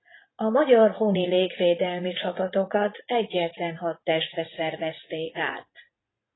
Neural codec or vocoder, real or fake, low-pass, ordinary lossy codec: vocoder, 44.1 kHz, 80 mel bands, Vocos; fake; 7.2 kHz; AAC, 16 kbps